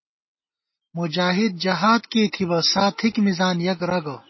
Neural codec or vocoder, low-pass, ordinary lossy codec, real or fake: none; 7.2 kHz; MP3, 24 kbps; real